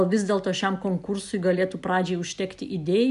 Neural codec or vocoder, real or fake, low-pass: none; real; 10.8 kHz